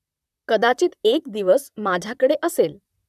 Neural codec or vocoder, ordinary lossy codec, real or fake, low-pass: vocoder, 44.1 kHz, 128 mel bands, Pupu-Vocoder; none; fake; 14.4 kHz